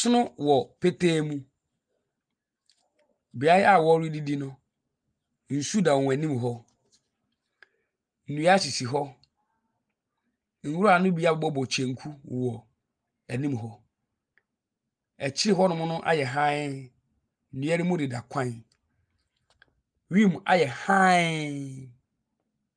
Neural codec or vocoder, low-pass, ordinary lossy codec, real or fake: none; 9.9 kHz; Opus, 32 kbps; real